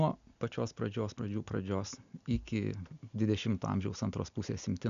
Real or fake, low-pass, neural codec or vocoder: real; 7.2 kHz; none